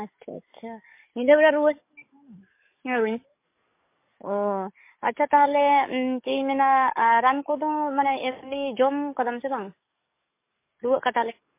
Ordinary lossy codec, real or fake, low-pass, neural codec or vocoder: MP3, 24 kbps; fake; 3.6 kHz; codec, 44.1 kHz, 7.8 kbps, DAC